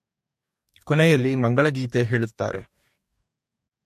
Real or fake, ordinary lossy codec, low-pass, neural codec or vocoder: fake; MP3, 64 kbps; 14.4 kHz; codec, 44.1 kHz, 2.6 kbps, DAC